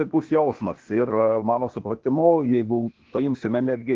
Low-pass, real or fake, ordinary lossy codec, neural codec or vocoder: 7.2 kHz; fake; Opus, 16 kbps; codec, 16 kHz, 0.8 kbps, ZipCodec